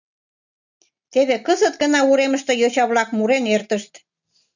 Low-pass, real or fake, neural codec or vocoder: 7.2 kHz; real; none